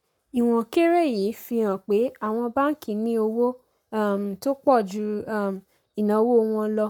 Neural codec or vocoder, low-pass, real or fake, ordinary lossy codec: codec, 44.1 kHz, 7.8 kbps, Pupu-Codec; 19.8 kHz; fake; none